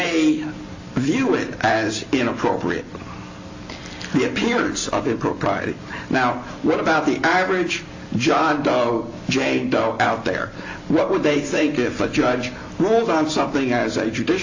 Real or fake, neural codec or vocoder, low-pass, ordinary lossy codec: real; none; 7.2 kHz; AAC, 48 kbps